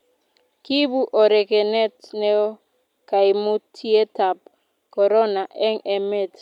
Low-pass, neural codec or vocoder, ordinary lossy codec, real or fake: 19.8 kHz; none; none; real